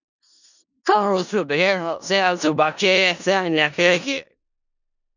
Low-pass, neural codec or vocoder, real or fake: 7.2 kHz; codec, 16 kHz in and 24 kHz out, 0.4 kbps, LongCat-Audio-Codec, four codebook decoder; fake